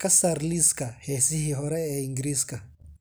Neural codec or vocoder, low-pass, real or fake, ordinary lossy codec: none; none; real; none